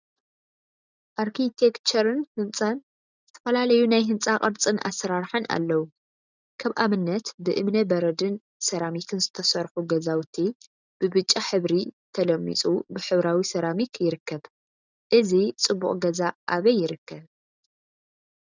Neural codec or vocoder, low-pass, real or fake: none; 7.2 kHz; real